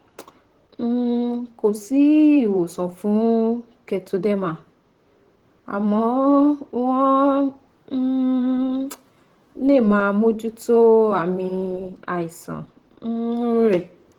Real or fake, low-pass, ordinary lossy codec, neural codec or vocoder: fake; 19.8 kHz; Opus, 16 kbps; vocoder, 44.1 kHz, 128 mel bands, Pupu-Vocoder